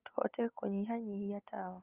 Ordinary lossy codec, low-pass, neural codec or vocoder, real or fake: Opus, 24 kbps; 3.6 kHz; none; real